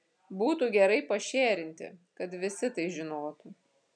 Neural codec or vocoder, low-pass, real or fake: none; 9.9 kHz; real